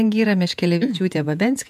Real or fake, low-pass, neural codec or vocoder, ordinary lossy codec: fake; 14.4 kHz; vocoder, 48 kHz, 128 mel bands, Vocos; MP3, 96 kbps